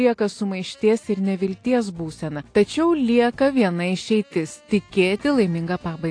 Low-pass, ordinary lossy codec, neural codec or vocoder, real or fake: 9.9 kHz; AAC, 48 kbps; none; real